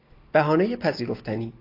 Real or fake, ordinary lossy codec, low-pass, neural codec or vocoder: real; AAC, 32 kbps; 5.4 kHz; none